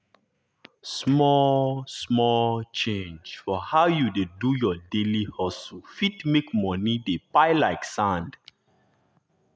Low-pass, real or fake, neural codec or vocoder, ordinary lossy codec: none; real; none; none